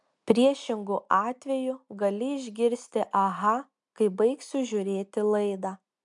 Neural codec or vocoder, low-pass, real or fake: none; 10.8 kHz; real